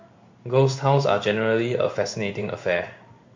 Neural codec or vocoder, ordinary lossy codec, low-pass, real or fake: codec, 16 kHz in and 24 kHz out, 1 kbps, XY-Tokenizer; MP3, 48 kbps; 7.2 kHz; fake